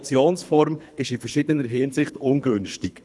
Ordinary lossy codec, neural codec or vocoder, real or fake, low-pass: none; codec, 24 kHz, 3 kbps, HILCodec; fake; none